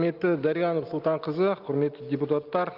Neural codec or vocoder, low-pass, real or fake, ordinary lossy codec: none; 5.4 kHz; real; Opus, 24 kbps